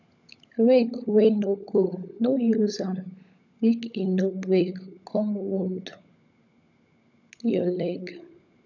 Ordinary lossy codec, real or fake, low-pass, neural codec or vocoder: none; fake; 7.2 kHz; codec, 16 kHz, 16 kbps, FunCodec, trained on LibriTTS, 50 frames a second